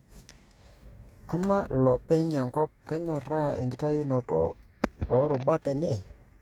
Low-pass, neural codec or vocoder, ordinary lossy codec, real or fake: 19.8 kHz; codec, 44.1 kHz, 2.6 kbps, DAC; none; fake